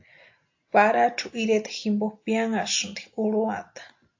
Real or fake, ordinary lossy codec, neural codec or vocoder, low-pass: real; AAC, 48 kbps; none; 7.2 kHz